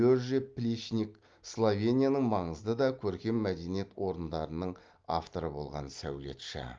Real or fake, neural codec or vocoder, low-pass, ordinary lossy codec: real; none; 7.2 kHz; Opus, 32 kbps